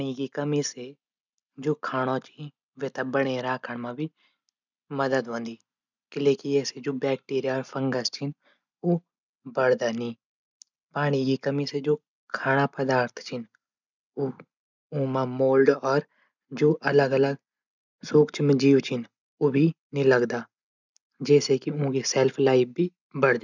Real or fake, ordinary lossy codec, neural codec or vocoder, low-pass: real; none; none; 7.2 kHz